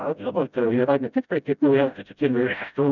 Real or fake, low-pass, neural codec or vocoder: fake; 7.2 kHz; codec, 16 kHz, 0.5 kbps, FreqCodec, smaller model